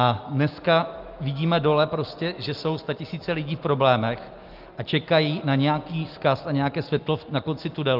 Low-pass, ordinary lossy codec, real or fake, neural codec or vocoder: 5.4 kHz; Opus, 32 kbps; real; none